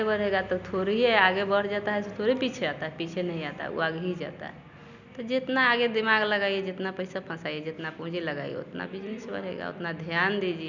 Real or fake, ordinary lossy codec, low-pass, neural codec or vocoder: real; none; 7.2 kHz; none